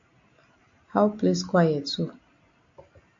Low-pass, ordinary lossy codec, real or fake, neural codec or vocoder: 7.2 kHz; AAC, 48 kbps; real; none